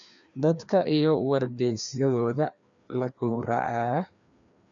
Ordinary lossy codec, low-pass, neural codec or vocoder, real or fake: MP3, 96 kbps; 7.2 kHz; codec, 16 kHz, 2 kbps, FreqCodec, larger model; fake